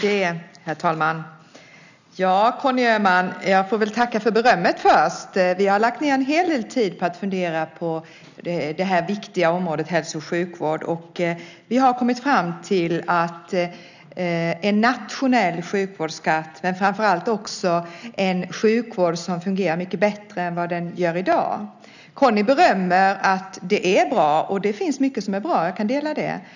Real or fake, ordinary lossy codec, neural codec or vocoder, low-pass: real; none; none; 7.2 kHz